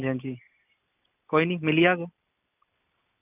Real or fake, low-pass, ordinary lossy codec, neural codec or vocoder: real; 3.6 kHz; none; none